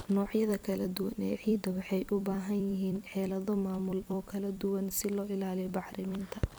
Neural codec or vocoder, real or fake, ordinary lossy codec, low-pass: vocoder, 44.1 kHz, 128 mel bands every 256 samples, BigVGAN v2; fake; none; none